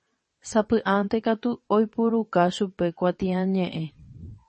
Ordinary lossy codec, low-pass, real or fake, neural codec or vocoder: MP3, 32 kbps; 9.9 kHz; fake; vocoder, 22.05 kHz, 80 mel bands, WaveNeXt